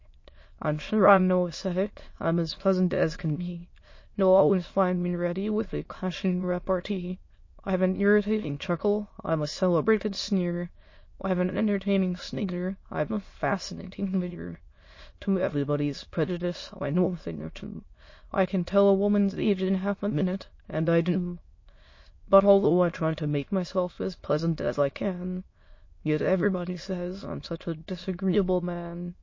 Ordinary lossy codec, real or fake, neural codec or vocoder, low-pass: MP3, 32 kbps; fake; autoencoder, 22.05 kHz, a latent of 192 numbers a frame, VITS, trained on many speakers; 7.2 kHz